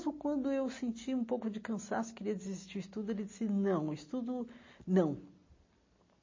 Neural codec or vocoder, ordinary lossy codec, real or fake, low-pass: none; MP3, 32 kbps; real; 7.2 kHz